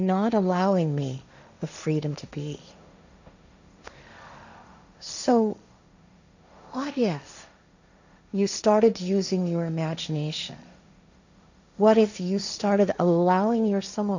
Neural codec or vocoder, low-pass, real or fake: codec, 16 kHz, 1.1 kbps, Voila-Tokenizer; 7.2 kHz; fake